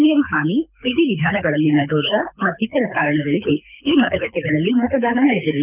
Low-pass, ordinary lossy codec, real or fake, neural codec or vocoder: 3.6 kHz; none; fake; codec, 24 kHz, 6 kbps, HILCodec